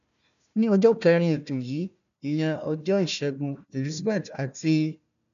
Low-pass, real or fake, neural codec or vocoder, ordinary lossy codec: 7.2 kHz; fake; codec, 16 kHz, 1 kbps, FunCodec, trained on Chinese and English, 50 frames a second; none